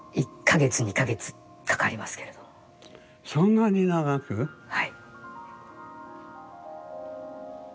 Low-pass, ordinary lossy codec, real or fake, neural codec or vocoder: none; none; real; none